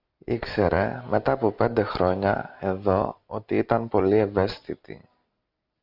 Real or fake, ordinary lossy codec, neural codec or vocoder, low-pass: real; Opus, 64 kbps; none; 5.4 kHz